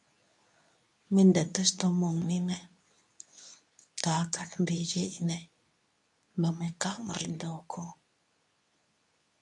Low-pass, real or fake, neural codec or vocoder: 10.8 kHz; fake; codec, 24 kHz, 0.9 kbps, WavTokenizer, medium speech release version 2